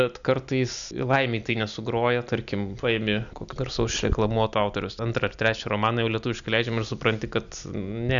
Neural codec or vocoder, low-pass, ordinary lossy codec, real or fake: none; 7.2 kHz; MP3, 96 kbps; real